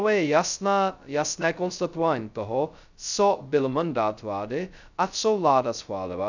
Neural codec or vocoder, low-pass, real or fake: codec, 16 kHz, 0.2 kbps, FocalCodec; 7.2 kHz; fake